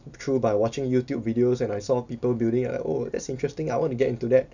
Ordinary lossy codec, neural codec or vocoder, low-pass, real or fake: none; none; 7.2 kHz; real